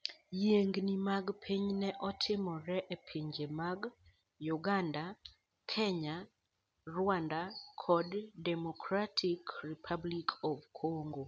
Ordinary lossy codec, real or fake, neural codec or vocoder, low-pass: none; real; none; none